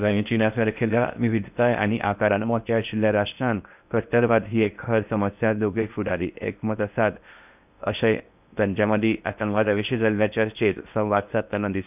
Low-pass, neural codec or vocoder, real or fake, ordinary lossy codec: 3.6 kHz; codec, 16 kHz in and 24 kHz out, 0.6 kbps, FocalCodec, streaming, 4096 codes; fake; none